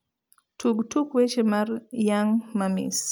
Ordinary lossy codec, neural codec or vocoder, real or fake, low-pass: none; none; real; none